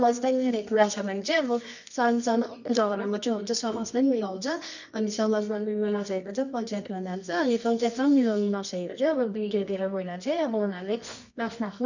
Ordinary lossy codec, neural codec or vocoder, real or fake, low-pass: none; codec, 24 kHz, 0.9 kbps, WavTokenizer, medium music audio release; fake; 7.2 kHz